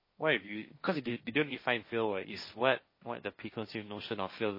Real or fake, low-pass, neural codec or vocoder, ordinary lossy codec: fake; 5.4 kHz; codec, 16 kHz, 1.1 kbps, Voila-Tokenizer; MP3, 24 kbps